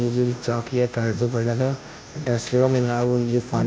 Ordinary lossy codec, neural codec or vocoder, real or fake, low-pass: none; codec, 16 kHz, 0.5 kbps, FunCodec, trained on Chinese and English, 25 frames a second; fake; none